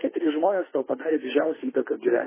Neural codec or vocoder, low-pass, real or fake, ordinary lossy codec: autoencoder, 48 kHz, 32 numbers a frame, DAC-VAE, trained on Japanese speech; 3.6 kHz; fake; MP3, 16 kbps